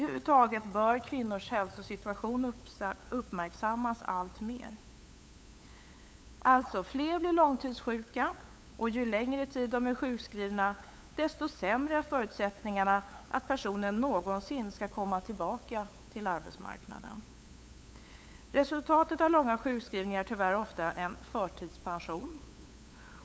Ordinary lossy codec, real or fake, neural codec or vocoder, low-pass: none; fake; codec, 16 kHz, 8 kbps, FunCodec, trained on LibriTTS, 25 frames a second; none